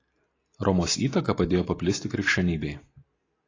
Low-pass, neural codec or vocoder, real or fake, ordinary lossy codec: 7.2 kHz; none; real; AAC, 32 kbps